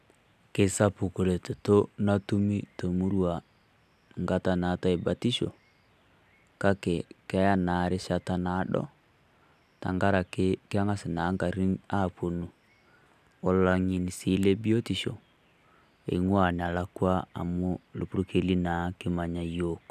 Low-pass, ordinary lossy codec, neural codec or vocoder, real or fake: 14.4 kHz; none; none; real